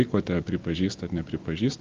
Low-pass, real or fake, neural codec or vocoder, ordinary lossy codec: 7.2 kHz; real; none; Opus, 32 kbps